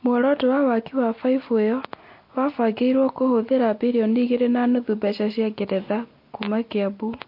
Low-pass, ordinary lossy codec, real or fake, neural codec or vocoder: 5.4 kHz; MP3, 32 kbps; real; none